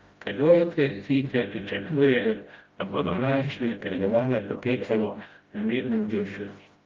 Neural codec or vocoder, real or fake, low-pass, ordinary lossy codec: codec, 16 kHz, 0.5 kbps, FreqCodec, smaller model; fake; 7.2 kHz; Opus, 32 kbps